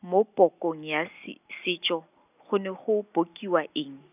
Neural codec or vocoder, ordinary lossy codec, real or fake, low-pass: none; none; real; 3.6 kHz